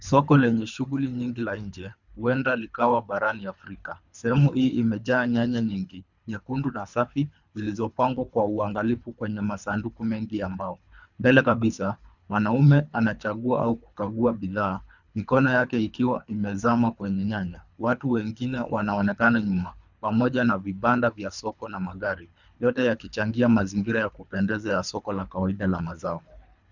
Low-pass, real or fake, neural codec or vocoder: 7.2 kHz; fake; codec, 24 kHz, 3 kbps, HILCodec